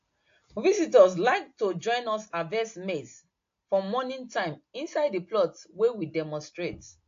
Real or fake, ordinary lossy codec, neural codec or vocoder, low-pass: real; AAC, 48 kbps; none; 7.2 kHz